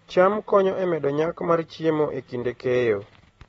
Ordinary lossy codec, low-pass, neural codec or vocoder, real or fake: AAC, 24 kbps; 19.8 kHz; none; real